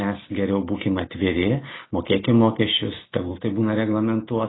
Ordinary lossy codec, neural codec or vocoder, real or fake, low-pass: AAC, 16 kbps; none; real; 7.2 kHz